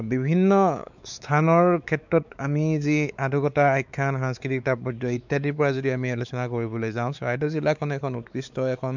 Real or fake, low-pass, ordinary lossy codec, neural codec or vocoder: fake; 7.2 kHz; none; codec, 16 kHz, 4 kbps, X-Codec, WavLM features, trained on Multilingual LibriSpeech